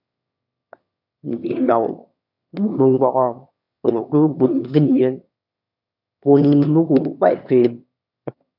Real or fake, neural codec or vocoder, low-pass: fake; autoencoder, 22.05 kHz, a latent of 192 numbers a frame, VITS, trained on one speaker; 5.4 kHz